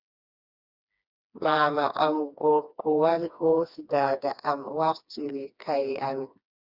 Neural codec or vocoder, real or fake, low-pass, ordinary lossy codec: codec, 16 kHz, 2 kbps, FreqCodec, smaller model; fake; 5.4 kHz; Opus, 64 kbps